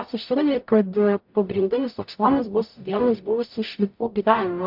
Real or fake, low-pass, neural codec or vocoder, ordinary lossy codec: fake; 5.4 kHz; codec, 44.1 kHz, 0.9 kbps, DAC; MP3, 48 kbps